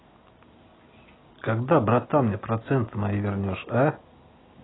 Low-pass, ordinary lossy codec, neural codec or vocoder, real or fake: 7.2 kHz; AAC, 16 kbps; none; real